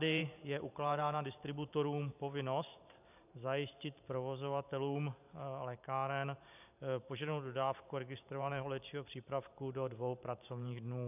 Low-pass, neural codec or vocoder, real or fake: 3.6 kHz; vocoder, 44.1 kHz, 128 mel bands every 512 samples, BigVGAN v2; fake